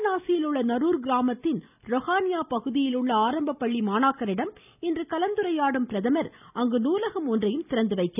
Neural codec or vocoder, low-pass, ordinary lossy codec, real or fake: none; 3.6 kHz; none; real